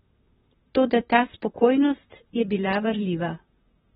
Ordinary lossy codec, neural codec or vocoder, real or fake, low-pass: AAC, 16 kbps; vocoder, 44.1 kHz, 128 mel bands, Pupu-Vocoder; fake; 19.8 kHz